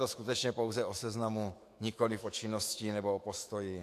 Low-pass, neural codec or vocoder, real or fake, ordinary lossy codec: 14.4 kHz; autoencoder, 48 kHz, 128 numbers a frame, DAC-VAE, trained on Japanese speech; fake; AAC, 64 kbps